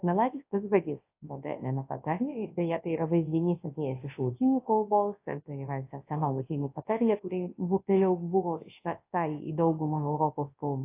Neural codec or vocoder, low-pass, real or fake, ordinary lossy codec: codec, 24 kHz, 0.9 kbps, WavTokenizer, large speech release; 3.6 kHz; fake; AAC, 24 kbps